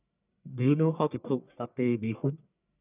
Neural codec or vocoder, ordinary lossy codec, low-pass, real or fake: codec, 44.1 kHz, 1.7 kbps, Pupu-Codec; none; 3.6 kHz; fake